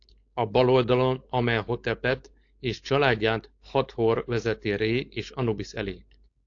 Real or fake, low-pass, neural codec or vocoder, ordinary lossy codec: fake; 7.2 kHz; codec, 16 kHz, 4.8 kbps, FACodec; AAC, 48 kbps